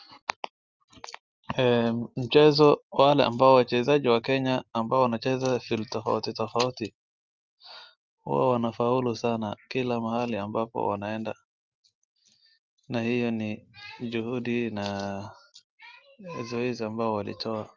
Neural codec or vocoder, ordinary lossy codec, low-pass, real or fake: none; Opus, 32 kbps; 7.2 kHz; real